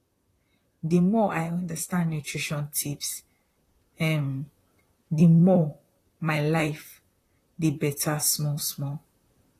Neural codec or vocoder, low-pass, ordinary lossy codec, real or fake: vocoder, 44.1 kHz, 128 mel bands, Pupu-Vocoder; 14.4 kHz; AAC, 48 kbps; fake